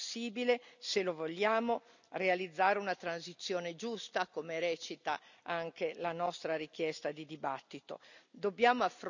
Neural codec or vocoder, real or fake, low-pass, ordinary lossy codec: none; real; 7.2 kHz; none